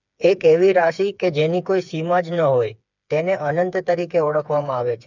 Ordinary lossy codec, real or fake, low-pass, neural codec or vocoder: none; fake; 7.2 kHz; codec, 16 kHz, 4 kbps, FreqCodec, smaller model